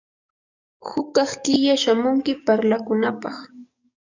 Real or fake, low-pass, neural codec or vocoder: fake; 7.2 kHz; codec, 44.1 kHz, 7.8 kbps, DAC